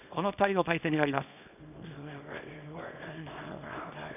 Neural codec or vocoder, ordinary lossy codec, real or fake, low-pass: codec, 24 kHz, 0.9 kbps, WavTokenizer, small release; none; fake; 3.6 kHz